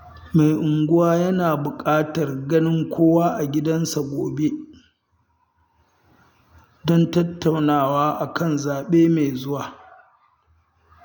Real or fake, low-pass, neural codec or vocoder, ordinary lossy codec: real; 19.8 kHz; none; none